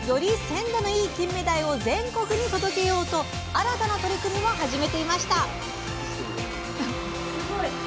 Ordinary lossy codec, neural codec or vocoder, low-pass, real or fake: none; none; none; real